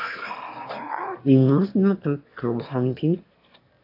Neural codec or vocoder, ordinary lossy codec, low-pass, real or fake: autoencoder, 22.05 kHz, a latent of 192 numbers a frame, VITS, trained on one speaker; AAC, 32 kbps; 5.4 kHz; fake